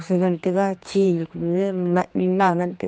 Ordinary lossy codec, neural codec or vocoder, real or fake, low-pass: none; codec, 16 kHz, 2 kbps, X-Codec, HuBERT features, trained on general audio; fake; none